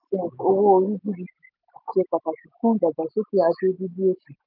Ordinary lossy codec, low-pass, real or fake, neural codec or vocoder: none; 5.4 kHz; real; none